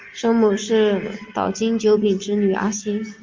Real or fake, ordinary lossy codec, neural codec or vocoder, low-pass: real; Opus, 32 kbps; none; 7.2 kHz